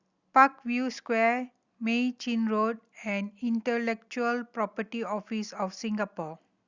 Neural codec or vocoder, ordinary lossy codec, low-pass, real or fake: none; Opus, 64 kbps; 7.2 kHz; real